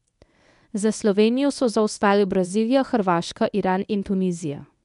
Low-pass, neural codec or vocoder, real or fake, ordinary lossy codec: 10.8 kHz; codec, 24 kHz, 0.9 kbps, WavTokenizer, medium speech release version 1; fake; none